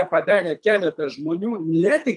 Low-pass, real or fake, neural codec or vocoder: 10.8 kHz; fake; codec, 24 kHz, 3 kbps, HILCodec